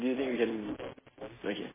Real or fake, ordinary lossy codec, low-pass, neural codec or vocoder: real; MP3, 16 kbps; 3.6 kHz; none